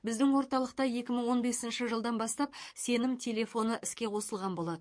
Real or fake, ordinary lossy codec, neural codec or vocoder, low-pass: fake; MP3, 48 kbps; codec, 44.1 kHz, 7.8 kbps, DAC; 9.9 kHz